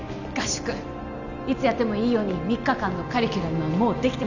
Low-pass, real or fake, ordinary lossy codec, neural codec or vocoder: 7.2 kHz; real; none; none